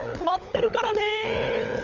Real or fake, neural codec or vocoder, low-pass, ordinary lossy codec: fake; codec, 16 kHz, 16 kbps, FunCodec, trained on LibriTTS, 50 frames a second; 7.2 kHz; none